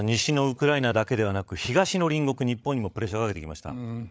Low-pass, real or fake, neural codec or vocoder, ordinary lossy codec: none; fake; codec, 16 kHz, 8 kbps, FreqCodec, larger model; none